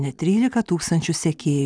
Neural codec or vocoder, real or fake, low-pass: none; real; 9.9 kHz